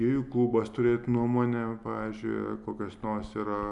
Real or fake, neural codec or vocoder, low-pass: real; none; 10.8 kHz